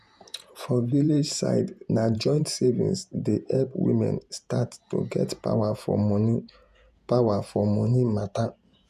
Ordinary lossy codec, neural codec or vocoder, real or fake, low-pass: none; vocoder, 48 kHz, 128 mel bands, Vocos; fake; 14.4 kHz